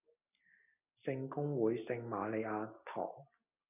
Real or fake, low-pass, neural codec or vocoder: real; 3.6 kHz; none